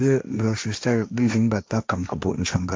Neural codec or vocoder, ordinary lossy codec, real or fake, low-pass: codec, 16 kHz, 1.1 kbps, Voila-Tokenizer; none; fake; none